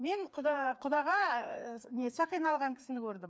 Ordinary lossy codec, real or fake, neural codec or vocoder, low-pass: none; fake; codec, 16 kHz, 2 kbps, FreqCodec, larger model; none